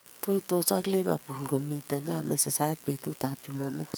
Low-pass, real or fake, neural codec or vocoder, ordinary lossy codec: none; fake; codec, 44.1 kHz, 2.6 kbps, SNAC; none